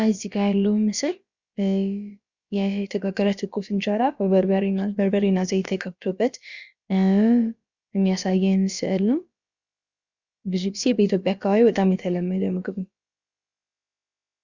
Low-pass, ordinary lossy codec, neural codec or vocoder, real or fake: 7.2 kHz; Opus, 64 kbps; codec, 16 kHz, about 1 kbps, DyCAST, with the encoder's durations; fake